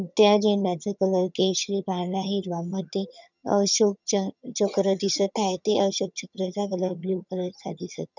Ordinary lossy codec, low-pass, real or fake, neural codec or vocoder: none; 7.2 kHz; fake; vocoder, 22.05 kHz, 80 mel bands, HiFi-GAN